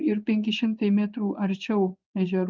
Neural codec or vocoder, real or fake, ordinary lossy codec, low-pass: codec, 16 kHz in and 24 kHz out, 1 kbps, XY-Tokenizer; fake; Opus, 32 kbps; 7.2 kHz